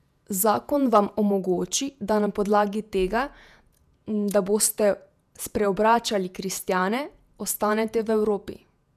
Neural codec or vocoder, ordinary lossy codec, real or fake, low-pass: vocoder, 48 kHz, 128 mel bands, Vocos; none; fake; 14.4 kHz